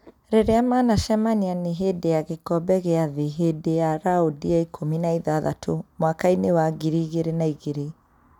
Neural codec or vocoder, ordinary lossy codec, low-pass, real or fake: none; none; 19.8 kHz; real